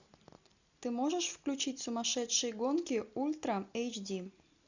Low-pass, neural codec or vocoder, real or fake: 7.2 kHz; none; real